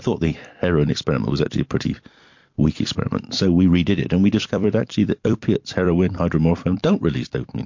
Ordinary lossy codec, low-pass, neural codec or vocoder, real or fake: MP3, 48 kbps; 7.2 kHz; vocoder, 44.1 kHz, 128 mel bands every 256 samples, BigVGAN v2; fake